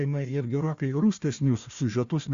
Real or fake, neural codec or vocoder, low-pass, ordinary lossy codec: fake; codec, 16 kHz, 1 kbps, FunCodec, trained on Chinese and English, 50 frames a second; 7.2 kHz; Opus, 64 kbps